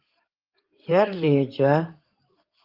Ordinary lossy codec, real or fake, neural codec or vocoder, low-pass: Opus, 32 kbps; fake; codec, 16 kHz in and 24 kHz out, 2.2 kbps, FireRedTTS-2 codec; 5.4 kHz